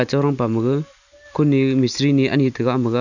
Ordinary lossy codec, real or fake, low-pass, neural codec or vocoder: none; real; 7.2 kHz; none